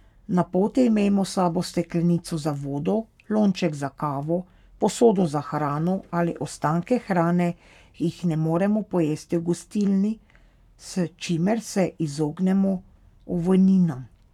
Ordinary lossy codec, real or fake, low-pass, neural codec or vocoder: none; fake; 19.8 kHz; codec, 44.1 kHz, 7.8 kbps, Pupu-Codec